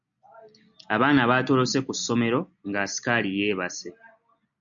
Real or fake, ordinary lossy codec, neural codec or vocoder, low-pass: real; MP3, 96 kbps; none; 7.2 kHz